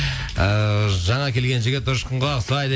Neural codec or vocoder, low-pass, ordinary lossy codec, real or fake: none; none; none; real